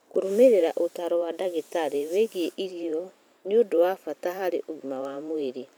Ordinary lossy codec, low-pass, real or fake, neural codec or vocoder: none; none; fake; vocoder, 44.1 kHz, 128 mel bands every 512 samples, BigVGAN v2